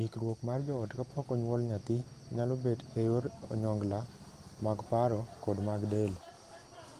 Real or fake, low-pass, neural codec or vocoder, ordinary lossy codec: real; 14.4 kHz; none; Opus, 24 kbps